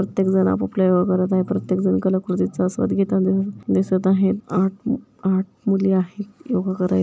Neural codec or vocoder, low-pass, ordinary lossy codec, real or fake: none; none; none; real